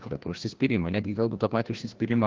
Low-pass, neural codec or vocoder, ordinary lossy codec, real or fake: 7.2 kHz; codec, 16 kHz, 1 kbps, FreqCodec, larger model; Opus, 16 kbps; fake